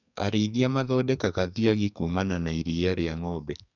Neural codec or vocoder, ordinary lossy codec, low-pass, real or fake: codec, 44.1 kHz, 2.6 kbps, SNAC; none; 7.2 kHz; fake